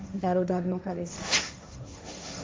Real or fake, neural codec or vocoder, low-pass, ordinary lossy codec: fake; codec, 16 kHz, 1.1 kbps, Voila-Tokenizer; none; none